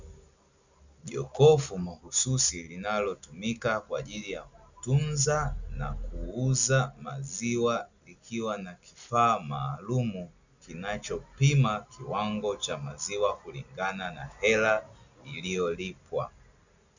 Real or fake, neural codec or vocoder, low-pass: real; none; 7.2 kHz